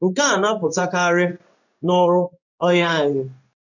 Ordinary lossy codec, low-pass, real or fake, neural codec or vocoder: none; 7.2 kHz; fake; codec, 16 kHz in and 24 kHz out, 1 kbps, XY-Tokenizer